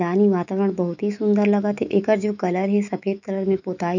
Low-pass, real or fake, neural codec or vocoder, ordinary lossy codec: 7.2 kHz; fake; autoencoder, 48 kHz, 128 numbers a frame, DAC-VAE, trained on Japanese speech; none